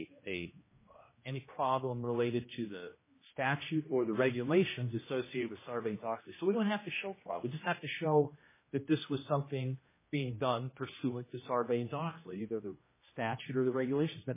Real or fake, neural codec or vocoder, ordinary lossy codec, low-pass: fake; codec, 16 kHz, 1 kbps, X-Codec, HuBERT features, trained on general audio; MP3, 16 kbps; 3.6 kHz